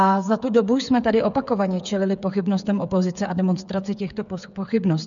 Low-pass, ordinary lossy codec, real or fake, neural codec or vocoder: 7.2 kHz; MP3, 96 kbps; fake; codec, 16 kHz, 8 kbps, FreqCodec, smaller model